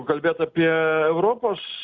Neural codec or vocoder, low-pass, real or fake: none; 7.2 kHz; real